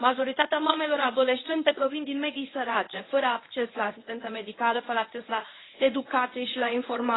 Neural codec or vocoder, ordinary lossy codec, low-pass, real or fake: codec, 24 kHz, 0.9 kbps, WavTokenizer, medium speech release version 1; AAC, 16 kbps; 7.2 kHz; fake